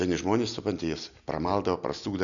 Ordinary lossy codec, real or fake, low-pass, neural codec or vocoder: MP3, 96 kbps; real; 7.2 kHz; none